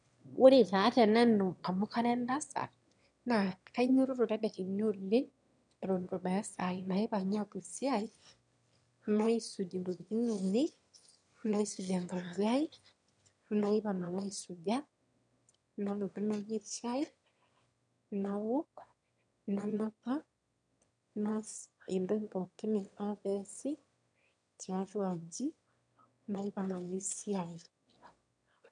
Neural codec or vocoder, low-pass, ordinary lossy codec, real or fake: autoencoder, 22.05 kHz, a latent of 192 numbers a frame, VITS, trained on one speaker; 9.9 kHz; none; fake